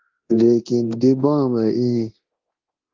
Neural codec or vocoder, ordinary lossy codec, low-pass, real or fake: codec, 24 kHz, 0.9 kbps, DualCodec; Opus, 16 kbps; 7.2 kHz; fake